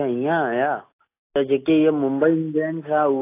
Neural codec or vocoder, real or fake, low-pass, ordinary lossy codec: none; real; 3.6 kHz; AAC, 24 kbps